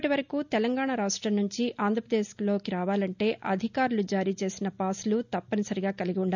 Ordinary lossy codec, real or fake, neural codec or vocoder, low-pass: none; real; none; 7.2 kHz